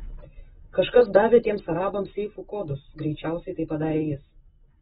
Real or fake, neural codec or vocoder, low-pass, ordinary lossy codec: real; none; 19.8 kHz; AAC, 16 kbps